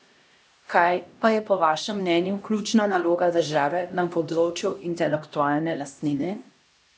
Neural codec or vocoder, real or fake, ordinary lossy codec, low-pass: codec, 16 kHz, 1 kbps, X-Codec, HuBERT features, trained on LibriSpeech; fake; none; none